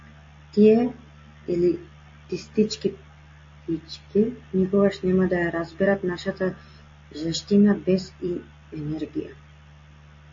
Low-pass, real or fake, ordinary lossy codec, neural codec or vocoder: 7.2 kHz; real; MP3, 32 kbps; none